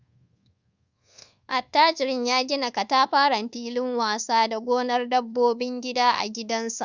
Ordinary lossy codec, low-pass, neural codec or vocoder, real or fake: Opus, 64 kbps; 7.2 kHz; codec, 24 kHz, 1.2 kbps, DualCodec; fake